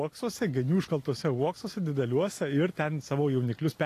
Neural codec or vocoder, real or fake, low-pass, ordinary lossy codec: none; real; 14.4 kHz; AAC, 48 kbps